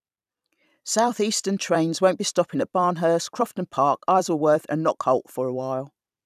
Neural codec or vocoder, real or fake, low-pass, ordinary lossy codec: vocoder, 44.1 kHz, 128 mel bands every 256 samples, BigVGAN v2; fake; 14.4 kHz; none